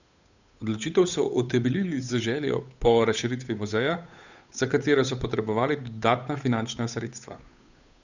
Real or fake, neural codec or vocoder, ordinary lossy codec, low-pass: fake; codec, 16 kHz, 8 kbps, FunCodec, trained on Chinese and English, 25 frames a second; none; 7.2 kHz